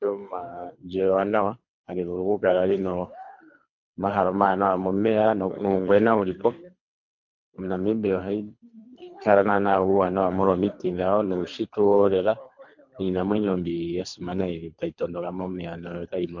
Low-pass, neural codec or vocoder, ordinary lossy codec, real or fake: 7.2 kHz; codec, 24 kHz, 3 kbps, HILCodec; MP3, 48 kbps; fake